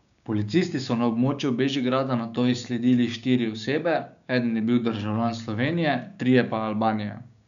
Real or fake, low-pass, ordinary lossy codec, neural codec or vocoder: fake; 7.2 kHz; none; codec, 16 kHz, 6 kbps, DAC